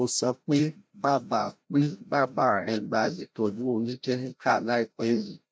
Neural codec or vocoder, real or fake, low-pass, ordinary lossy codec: codec, 16 kHz, 0.5 kbps, FreqCodec, larger model; fake; none; none